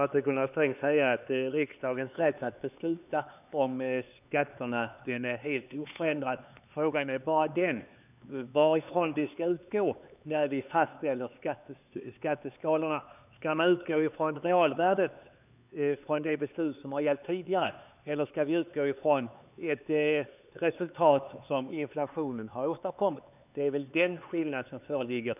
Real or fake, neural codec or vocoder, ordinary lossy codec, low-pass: fake; codec, 16 kHz, 4 kbps, X-Codec, HuBERT features, trained on LibriSpeech; none; 3.6 kHz